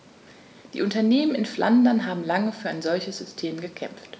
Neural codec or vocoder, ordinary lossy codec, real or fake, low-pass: none; none; real; none